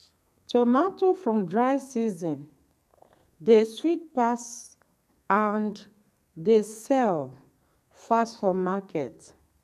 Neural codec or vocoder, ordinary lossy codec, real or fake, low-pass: codec, 32 kHz, 1.9 kbps, SNAC; none; fake; 14.4 kHz